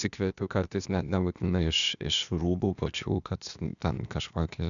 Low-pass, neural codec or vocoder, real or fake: 7.2 kHz; codec, 16 kHz, 0.8 kbps, ZipCodec; fake